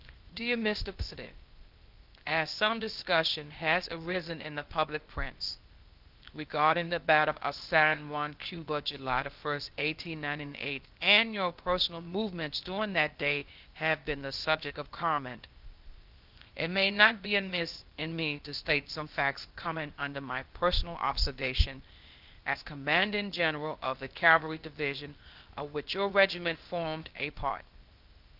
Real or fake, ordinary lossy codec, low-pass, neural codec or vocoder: fake; Opus, 32 kbps; 5.4 kHz; codec, 16 kHz, 0.8 kbps, ZipCodec